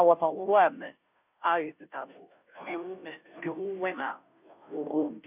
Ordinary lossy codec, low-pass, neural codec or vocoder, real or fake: none; 3.6 kHz; codec, 16 kHz, 0.5 kbps, FunCodec, trained on Chinese and English, 25 frames a second; fake